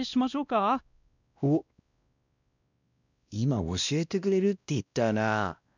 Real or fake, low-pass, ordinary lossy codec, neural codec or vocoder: fake; 7.2 kHz; none; codec, 16 kHz, 1 kbps, X-Codec, WavLM features, trained on Multilingual LibriSpeech